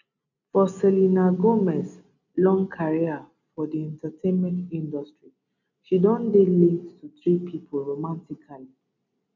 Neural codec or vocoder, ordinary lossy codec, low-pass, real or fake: none; none; 7.2 kHz; real